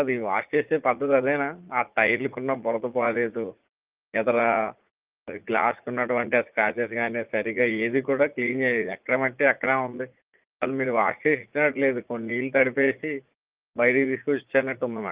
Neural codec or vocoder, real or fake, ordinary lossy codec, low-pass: vocoder, 44.1 kHz, 80 mel bands, Vocos; fake; Opus, 32 kbps; 3.6 kHz